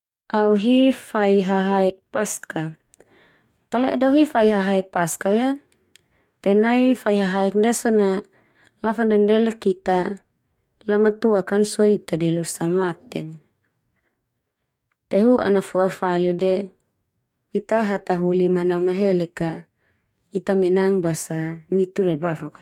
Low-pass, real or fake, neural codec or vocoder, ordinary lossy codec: 19.8 kHz; fake; codec, 44.1 kHz, 2.6 kbps, DAC; MP3, 96 kbps